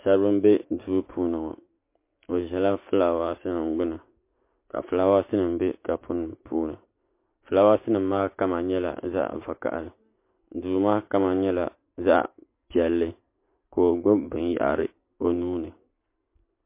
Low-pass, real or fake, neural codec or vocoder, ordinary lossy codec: 3.6 kHz; real; none; MP3, 24 kbps